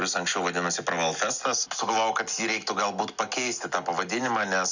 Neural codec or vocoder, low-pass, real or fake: none; 7.2 kHz; real